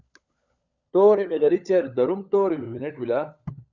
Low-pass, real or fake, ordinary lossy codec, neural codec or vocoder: 7.2 kHz; fake; Opus, 64 kbps; codec, 16 kHz, 16 kbps, FunCodec, trained on LibriTTS, 50 frames a second